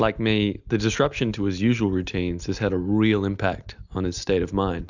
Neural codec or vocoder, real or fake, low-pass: none; real; 7.2 kHz